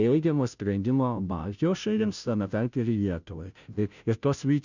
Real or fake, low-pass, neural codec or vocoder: fake; 7.2 kHz; codec, 16 kHz, 0.5 kbps, FunCodec, trained on Chinese and English, 25 frames a second